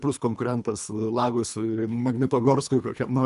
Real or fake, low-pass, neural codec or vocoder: fake; 10.8 kHz; codec, 24 kHz, 3 kbps, HILCodec